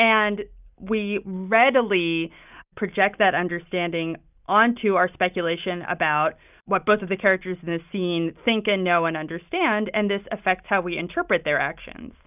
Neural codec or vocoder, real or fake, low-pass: none; real; 3.6 kHz